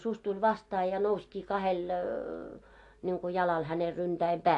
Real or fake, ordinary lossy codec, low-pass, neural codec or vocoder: real; AAC, 48 kbps; 10.8 kHz; none